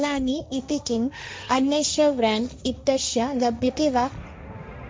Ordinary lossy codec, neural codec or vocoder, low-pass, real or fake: none; codec, 16 kHz, 1.1 kbps, Voila-Tokenizer; none; fake